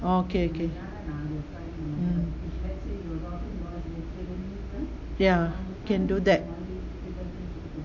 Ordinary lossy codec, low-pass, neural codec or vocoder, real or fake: AAC, 48 kbps; 7.2 kHz; none; real